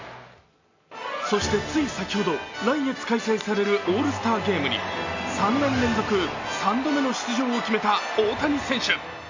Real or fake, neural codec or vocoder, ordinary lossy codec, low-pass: real; none; AAC, 48 kbps; 7.2 kHz